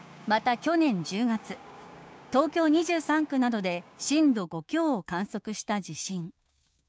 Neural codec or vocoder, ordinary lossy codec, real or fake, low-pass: codec, 16 kHz, 6 kbps, DAC; none; fake; none